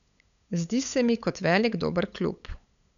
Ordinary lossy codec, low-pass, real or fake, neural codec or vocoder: none; 7.2 kHz; fake; codec, 16 kHz, 8 kbps, FunCodec, trained on LibriTTS, 25 frames a second